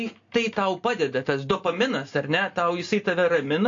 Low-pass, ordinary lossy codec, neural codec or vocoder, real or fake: 7.2 kHz; AAC, 48 kbps; none; real